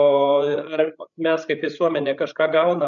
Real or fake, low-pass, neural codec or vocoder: fake; 7.2 kHz; codec, 16 kHz, 16 kbps, FreqCodec, larger model